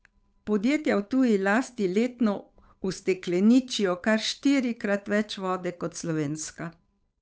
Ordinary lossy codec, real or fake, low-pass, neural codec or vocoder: none; fake; none; codec, 16 kHz, 8 kbps, FunCodec, trained on Chinese and English, 25 frames a second